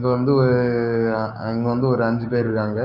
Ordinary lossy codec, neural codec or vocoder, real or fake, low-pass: none; codec, 16 kHz, 6 kbps, DAC; fake; 5.4 kHz